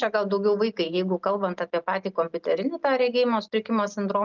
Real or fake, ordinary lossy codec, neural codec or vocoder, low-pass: real; Opus, 32 kbps; none; 7.2 kHz